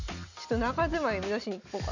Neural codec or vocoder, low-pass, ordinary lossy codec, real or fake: none; 7.2 kHz; none; real